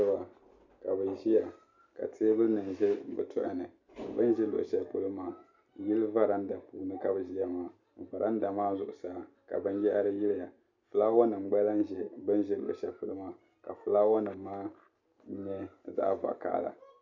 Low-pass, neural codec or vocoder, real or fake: 7.2 kHz; none; real